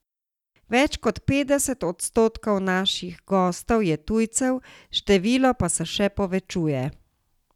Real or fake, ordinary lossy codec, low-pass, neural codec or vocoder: real; none; 19.8 kHz; none